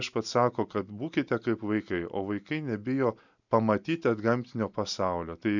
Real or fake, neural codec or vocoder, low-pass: real; none; 7.2 kHz